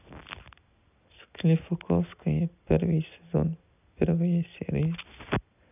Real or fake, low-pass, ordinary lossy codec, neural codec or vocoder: real; 3.6 kHz; none; none